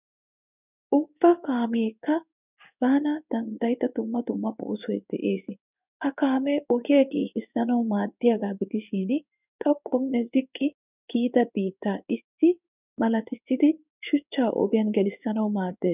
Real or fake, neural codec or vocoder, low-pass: fake; codec, 16 kHz in and 24 kHz out, 1 kbps, XY-Tokenizer; 3.6 kHz